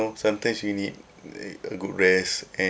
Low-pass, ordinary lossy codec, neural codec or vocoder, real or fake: none; none; none; real